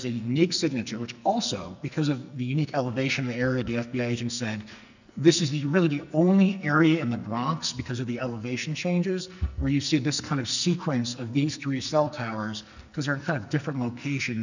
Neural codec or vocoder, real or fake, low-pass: codec, 44.1 kHz, 2.6 kbps, SNAC; fake; 7.2 kHz